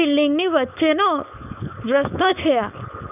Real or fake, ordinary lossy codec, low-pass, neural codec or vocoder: fake; none; 3.6 kHz; codec, 16 kHz, 4.8 kbps, FACodec